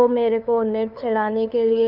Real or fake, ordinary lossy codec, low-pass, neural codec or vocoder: fake; none; 5.4 kHz; codec, 16 kHz, 2 kbps, FunCodec, trained on LibriTTS, 25 frames a second